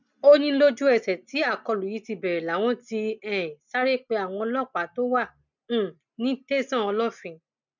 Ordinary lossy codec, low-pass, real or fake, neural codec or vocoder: none; 7.2 kHz; real; none